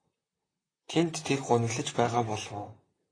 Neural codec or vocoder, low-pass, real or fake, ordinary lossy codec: vocoder, 44.1 kHz, 128 mel bands, Pupu-Vocoder; 9.9 kHz; fake; AAC, 32 kbps